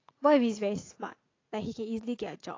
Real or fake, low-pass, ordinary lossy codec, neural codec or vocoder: fake; 7.2 kHz; AAC, 32 kbps; vocoder, 44.1 kHz, 80 mel bands, Vocos